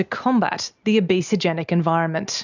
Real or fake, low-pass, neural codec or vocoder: real; 7.2 kHz; none